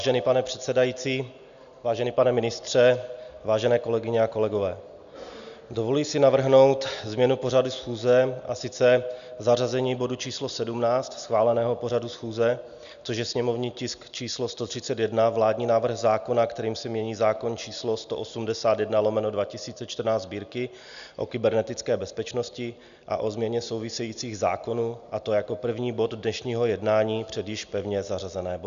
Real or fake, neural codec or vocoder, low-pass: real; none; 7.2 kHz